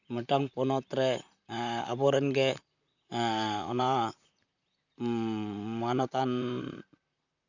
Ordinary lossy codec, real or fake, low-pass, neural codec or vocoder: none; fake; 7.2 kHz; vocoder, 44.1 kHz, 128 mel bands, Pupu-Vocoder